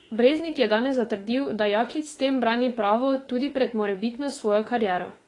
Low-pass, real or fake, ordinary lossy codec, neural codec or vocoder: 10.8 kHz; fake; AAC, 32 kbps; autoencoder, 48 kHz, 32 numbers a frame, DAC-VAE, trained on Japanese speech